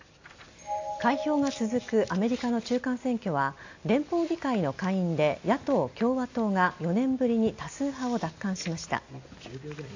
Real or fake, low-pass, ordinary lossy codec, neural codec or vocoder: real; 7.2 kHz; AAC, 48 kbps; none